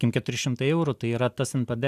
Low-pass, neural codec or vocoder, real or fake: 14.4 kHz; none; real